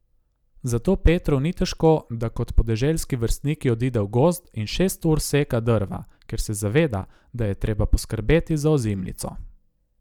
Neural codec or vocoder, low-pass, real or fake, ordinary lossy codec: none; 19.8 kHz; real; none